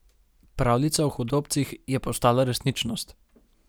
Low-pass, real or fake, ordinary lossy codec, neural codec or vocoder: none; real; none; none